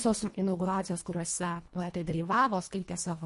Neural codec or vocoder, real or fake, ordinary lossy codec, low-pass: codec, 24 kHz, 1.5 kbps, HILCodec; fake; MP3, 48 kbps; 10.8 kHz